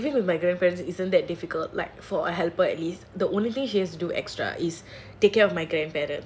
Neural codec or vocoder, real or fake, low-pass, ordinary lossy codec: none; real; none; none